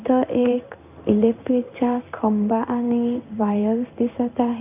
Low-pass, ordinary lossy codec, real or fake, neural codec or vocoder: 3.6 kHz; none; fake; codec, 16 kHz in and 24 kHz out, 1 kbps, XY-Tokenizer